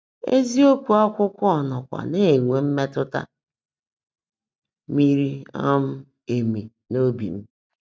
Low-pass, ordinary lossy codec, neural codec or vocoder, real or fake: none; none; none; real